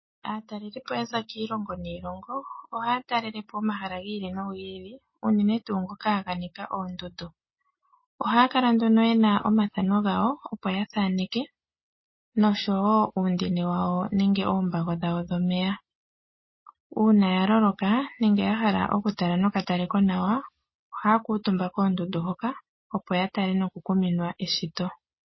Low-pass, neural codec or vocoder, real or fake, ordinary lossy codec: 7.2 kHz; none; real; MP3, 24 kbps